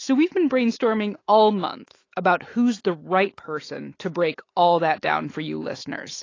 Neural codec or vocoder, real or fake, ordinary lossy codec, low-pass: autoencoder, 48 kHz, 128 numbers a frame, DAC-VAE, trained on Japanese speech; fake; AAC, 32 kbps; 7.2 kHz